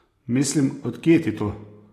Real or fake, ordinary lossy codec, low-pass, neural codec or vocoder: real; AAC, 48 kbps; 14.4 kHz; none